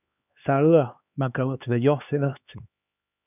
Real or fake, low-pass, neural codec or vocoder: fake; 3.6 kHz; codec, 16 kHz, 2 kbps, X-Codec, HuBERT features, trained on LibriSpeech